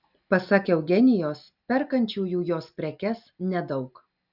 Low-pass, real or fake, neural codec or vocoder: 5.4 kHz; real; none